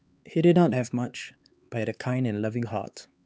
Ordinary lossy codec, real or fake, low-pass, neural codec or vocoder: none; fake; none; codec, 16 kHz, 4 kbps, X-Codec, HuBERT features, trained on LibriSpeech